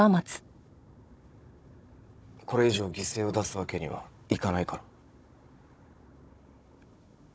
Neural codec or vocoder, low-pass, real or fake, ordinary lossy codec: codec, 16 kHz, 16 kbps, FunCodec, trained on Chinese and English, 50 frames a second; none; fake; none